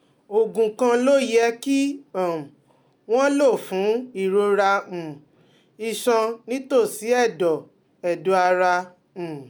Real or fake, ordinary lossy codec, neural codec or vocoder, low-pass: real; none; none; none